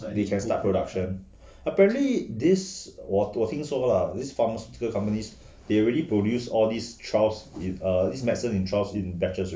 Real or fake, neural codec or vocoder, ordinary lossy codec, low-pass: real; none; none; none